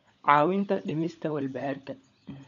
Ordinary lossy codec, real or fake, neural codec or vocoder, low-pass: none; fake; codec, 16 kHz, 16 kbps, FunCodec, trained on LibriTTS, 50 frames a second; 7.2 kHz